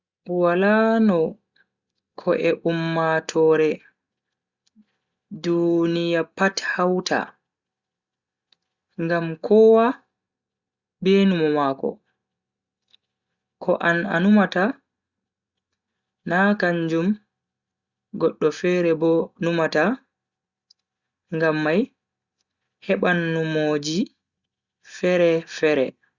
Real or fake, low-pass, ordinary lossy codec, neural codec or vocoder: real; 7.2 kHz; Opus, 64 kbps; none